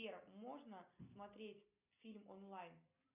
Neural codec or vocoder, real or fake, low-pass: none; real; 3.6 kHz